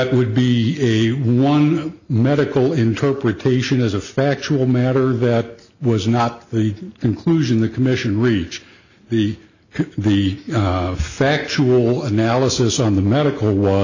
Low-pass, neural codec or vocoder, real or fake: 7.2 kHz; none; real